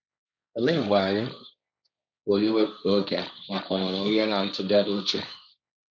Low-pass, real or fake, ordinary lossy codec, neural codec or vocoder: none; fake; none; codec, 16 kHz, 1.1 kbps, Voila-Tokenizer